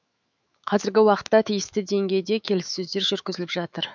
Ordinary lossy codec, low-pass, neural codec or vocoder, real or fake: none; 7.2 kHz; autoencoder, 48 kHz, 128 numbers a frame, DAC-VAE, trained on Japanese speech; fake